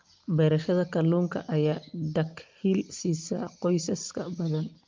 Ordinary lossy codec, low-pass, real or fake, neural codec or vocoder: Opus, 32 kbps; 7.2 kHz; real; none